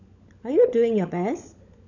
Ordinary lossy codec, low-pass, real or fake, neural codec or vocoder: none; 7.2 kHz; fake; codec, 16 kHz, 16 kbps, FunCodec, trained on LibriTTS, 50 frames a second